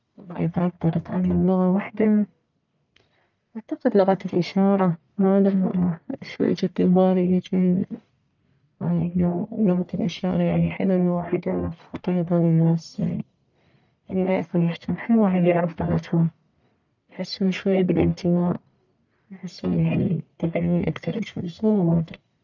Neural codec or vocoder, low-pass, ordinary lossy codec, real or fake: codec, 44.1 kHz, 1.7 kbps, Pupu-Codec; 7.2 kHz; none; fake